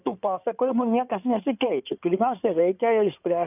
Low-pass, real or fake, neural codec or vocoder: 3.6 kHz; fake; codec, 16 kHz in and 24 kHz out, 2.2 kbps, FireRedTTS-2 codec